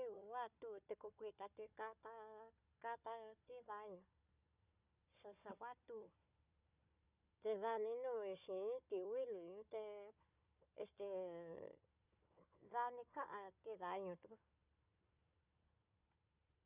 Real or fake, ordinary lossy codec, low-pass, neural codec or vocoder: fake; none; 3.6 kHz; codec, 16 kHz, 4 kbps, FunCodec, trained on Chinese and English, 50 frames a second